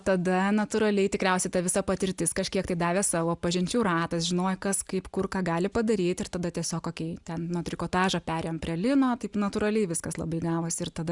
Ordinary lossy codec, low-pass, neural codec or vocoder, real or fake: Opus, 64 kbps; 10.8 kHz; none; real